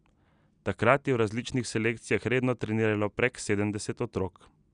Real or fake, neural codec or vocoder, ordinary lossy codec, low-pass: real; none; none; 9.9 kHz